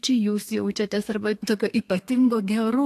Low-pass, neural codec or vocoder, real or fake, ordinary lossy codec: 14.4 kHz; codec, 32 kHz, 1.9 kbps, SNAC; fake; AAC, 64 kbps